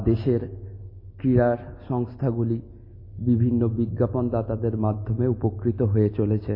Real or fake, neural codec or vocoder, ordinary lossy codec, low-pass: real; none; MP3, 24 kbps; 5.4 kHz